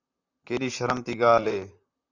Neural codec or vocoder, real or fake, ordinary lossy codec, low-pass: vocoder, 44.1 kHz, 128 mel bands, Pupu-Vocoder; fake; Opus, 64 kbps; 7.2 kHz